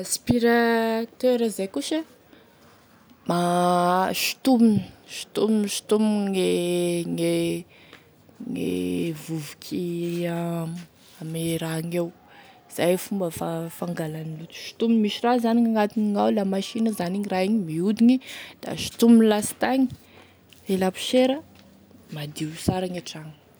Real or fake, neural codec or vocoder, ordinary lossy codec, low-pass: real; none; none; none